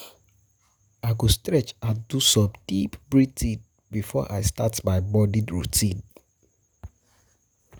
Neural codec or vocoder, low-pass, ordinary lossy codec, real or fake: none; none; none; real